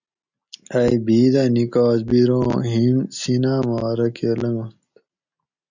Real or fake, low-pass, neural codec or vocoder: real; 7.2 kHz; none